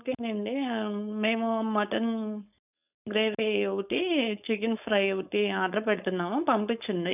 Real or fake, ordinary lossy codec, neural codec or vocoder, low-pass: fake; none; codec, 16 kHz, 4.8 kbps, FACodec; 3.6 kHz